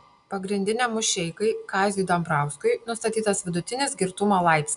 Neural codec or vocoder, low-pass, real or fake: none; 10.8 kHz; real